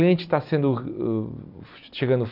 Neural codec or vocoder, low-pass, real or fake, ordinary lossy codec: none; 5.4 kHz; real; none